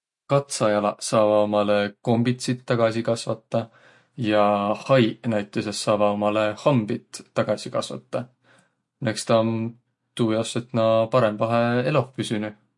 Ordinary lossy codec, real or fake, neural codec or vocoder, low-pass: MP3, 48 kbps; real; none; 10.8 kHz